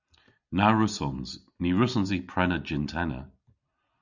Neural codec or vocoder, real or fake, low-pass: none; real; 7.2 kHz